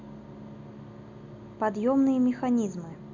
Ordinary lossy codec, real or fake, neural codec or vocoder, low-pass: none; real; none; 7.2 kHz